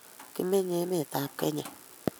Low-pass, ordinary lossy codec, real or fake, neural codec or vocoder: none; none; real; none